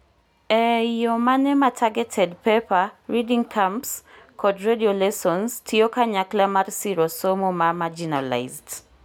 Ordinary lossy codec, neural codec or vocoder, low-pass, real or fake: none; none; none; real